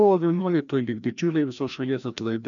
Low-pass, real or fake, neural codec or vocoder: 7.2 kHz; fake; codec, 16 kHz, 1 kbps, FreqCodec, larger model